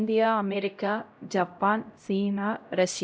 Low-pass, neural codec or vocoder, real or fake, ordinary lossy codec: none; codec, 16 kHz, 0.5 kbps, X-Codec, HuBERT features, trained on LibriSpeech; fake; none